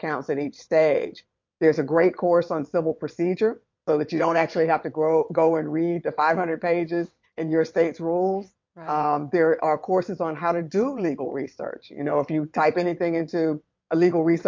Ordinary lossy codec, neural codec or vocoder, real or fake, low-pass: MP3, 48 kbps; vocoder, 22.05 kHz, 80 mel bands, WaveNeXt; fake; 7.2 kHz